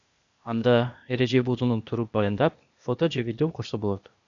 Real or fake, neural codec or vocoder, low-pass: fake; codec, 16 kHz, 0.8 kbps, ZipCodec; 7.2 kHz